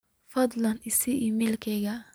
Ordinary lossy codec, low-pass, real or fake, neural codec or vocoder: none; none; real; none